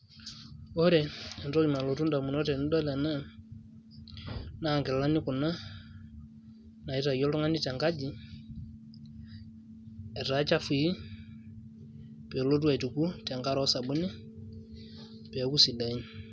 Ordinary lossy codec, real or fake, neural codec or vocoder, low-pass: none; real; none; none